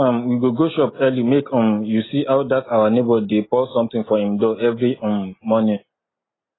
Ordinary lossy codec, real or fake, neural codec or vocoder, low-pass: AAC, 16 kbps; real; none; 7.2 kHz